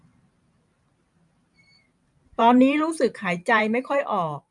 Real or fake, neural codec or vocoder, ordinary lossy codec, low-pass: fake; vocoder, 44.1 kHz, 128 mel bands every 512 samples, BigVGAN v2; none; 10.8 kHz